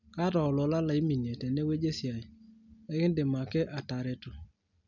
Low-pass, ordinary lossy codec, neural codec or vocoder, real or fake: 7.2 kHz; none; none; real